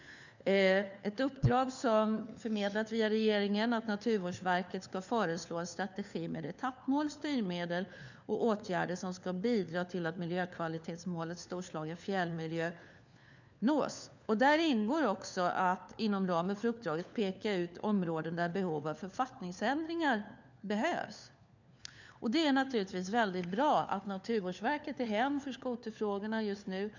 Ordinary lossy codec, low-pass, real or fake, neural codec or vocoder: none; 7.2 kHz; fake; codec, 16 kHz, 4 kbps, FunCodec, trained on LibriTTS, 50 frames a second